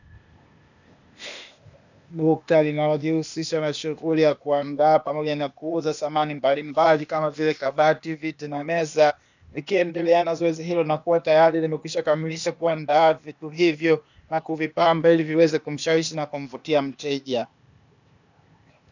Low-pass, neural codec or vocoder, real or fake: 7.2 kHz; codec, 16 kHz, 0.8 kbps, ZipCodec; fake